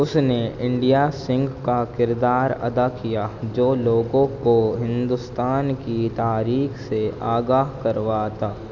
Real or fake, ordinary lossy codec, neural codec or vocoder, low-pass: real; none; none; 7.2 kHz